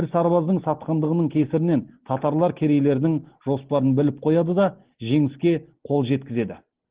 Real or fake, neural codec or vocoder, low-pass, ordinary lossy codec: real; none; 3.6 kHz; Opus, 16 kbps